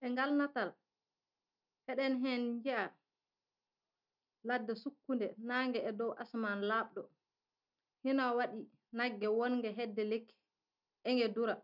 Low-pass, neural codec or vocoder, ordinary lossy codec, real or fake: 5.4 kHz; none; none; real